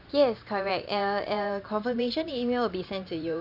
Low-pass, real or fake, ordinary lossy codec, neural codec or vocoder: 5.4 kHz; fake; none; vocoder, 44.1 kHz, 128 mel bands every 512 samples, BigVGAN v2